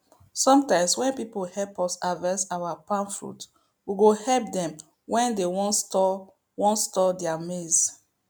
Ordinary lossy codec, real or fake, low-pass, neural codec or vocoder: none; real; none; none